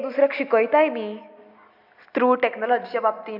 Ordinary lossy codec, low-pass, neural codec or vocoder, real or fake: AAC, 48 kbps; 5.4 kHz; none; real